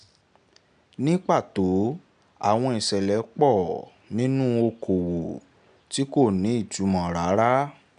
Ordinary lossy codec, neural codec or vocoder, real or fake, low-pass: none; none; real; 9.9 kHz